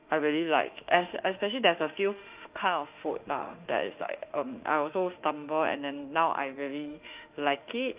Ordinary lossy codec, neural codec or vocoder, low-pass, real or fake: Opus, 24 kbps; autoencoder, 48 kHz, 32 numbers a frame, DAC-VAE, trained on Japanese speech; 3.6 kHz; fake